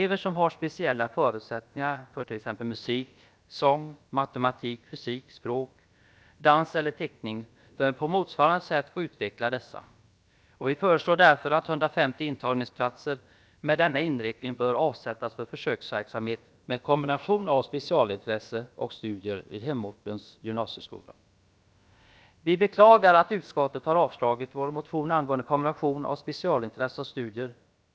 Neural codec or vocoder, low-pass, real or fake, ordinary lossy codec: codec, 16 kHz, about 1 kbps, DyCAST, with the encoder's durations; none; fake; none